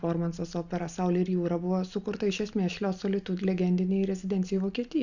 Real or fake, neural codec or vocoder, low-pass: real; none; 7.2 kHz